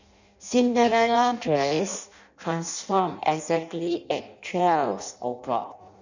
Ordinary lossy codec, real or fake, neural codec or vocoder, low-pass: none; fake; codec, 16 kHz in and 24 kHz out, 0.6 kbps, FireRedTTS-2 codec; 7.2 kHz